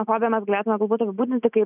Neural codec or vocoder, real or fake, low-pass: none; real; 3.6 kHz